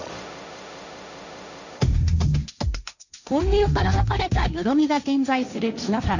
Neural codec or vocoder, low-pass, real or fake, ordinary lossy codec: codec, 16 kHz, 1.1 kbps, Voila-Tokenizer; 7.2 kHz; fake; none